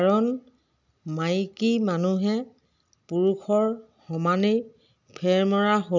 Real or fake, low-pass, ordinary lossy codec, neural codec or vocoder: real; 7.2 kHz; none; none